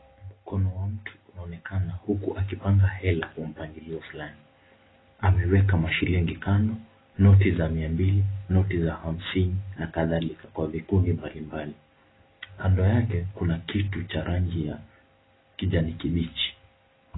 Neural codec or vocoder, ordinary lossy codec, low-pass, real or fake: none; AAC, 16 kbps; 7.2 kHz; real